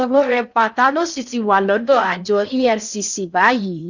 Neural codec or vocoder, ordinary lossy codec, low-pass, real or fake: codec, 16 kHz in and 24 kHz out, 0.6 kbps, FocalCodec, streaming, 4096 codes; none; 7.2 kHz; fake